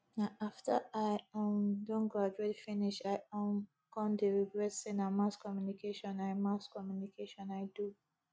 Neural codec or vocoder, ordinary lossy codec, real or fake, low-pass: none; none; real; none